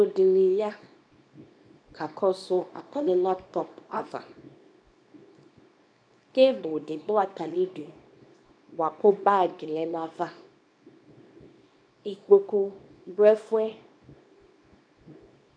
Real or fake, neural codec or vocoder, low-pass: fake; codec, 24 kHz, 0.9 kbps, WavTokenizer, small release; 9.9 kHz